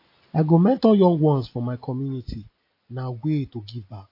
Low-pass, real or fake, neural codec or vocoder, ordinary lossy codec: 5.4 kHz; real; none; AAC, 32 kbps